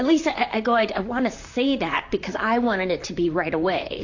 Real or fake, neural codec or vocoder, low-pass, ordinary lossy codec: fake; vocoder, 44.1 kHz, 128 mel bands, Pupu-Vocoder; 7.2 kHz; AAC, 48 kbps